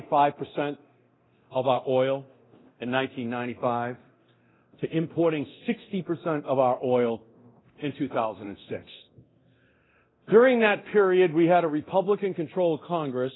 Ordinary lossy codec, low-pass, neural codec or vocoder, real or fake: AAC, 16 kbps; 7.2 kHz; codec, 24 kHz, 0.9 kbps, DualCodec; fake